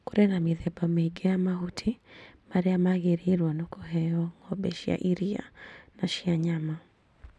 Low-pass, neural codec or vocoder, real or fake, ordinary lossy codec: none; none; real; none